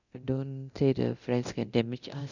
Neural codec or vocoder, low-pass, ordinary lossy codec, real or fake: codec, 24 kHz, 0.9 kbps, DualCodec; 7.2 kHz; none; fake